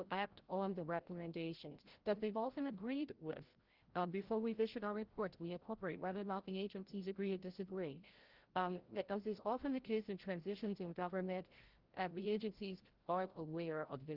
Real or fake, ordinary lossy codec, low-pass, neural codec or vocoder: fake; Opus, 16 kbps; 5.4 kHz; codec, 16 kHz, 0.5 kbps, FreqCodec, larger model